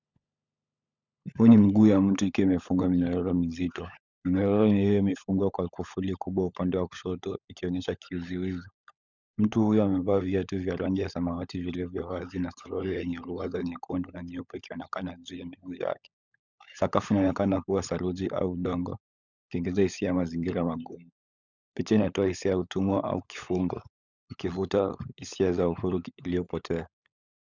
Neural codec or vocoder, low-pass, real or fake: codec, 16 kHz, 16 kbps, FunCodec, trained on LibriTTS, 50 frames a second; 7.2 kHz; fake